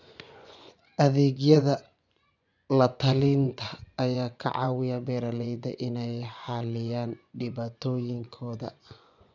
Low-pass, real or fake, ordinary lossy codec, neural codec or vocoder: 7.2 kHz; fake; none; vocoder, 24 kHz, 100 mel bands, Vocos